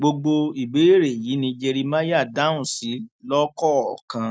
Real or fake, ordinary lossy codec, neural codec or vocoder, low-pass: real; none; none; none